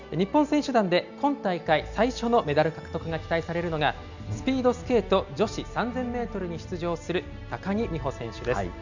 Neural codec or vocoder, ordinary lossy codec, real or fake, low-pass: none; none; real; 7.2 kHz